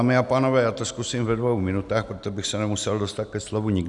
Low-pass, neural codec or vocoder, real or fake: 10.8 kHz; none; real